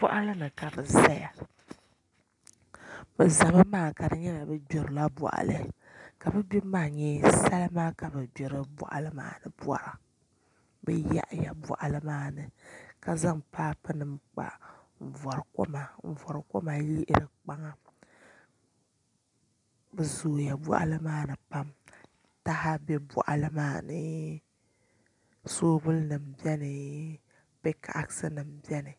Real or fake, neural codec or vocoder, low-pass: real; none; 10.8 kHz